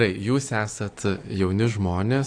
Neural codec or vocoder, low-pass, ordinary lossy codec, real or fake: none; 9.9 kHz; AAC, 48 kbps; real